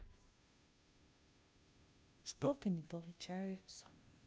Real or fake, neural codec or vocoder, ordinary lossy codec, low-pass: fake; codec, 16 kHz, 0.5 kbps, FunCodec, trained on Chinese and English, 25 frames a second; none; none